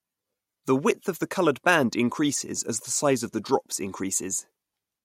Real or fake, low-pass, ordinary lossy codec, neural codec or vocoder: real; 19.8 kHz; MP3, 64 kbps; none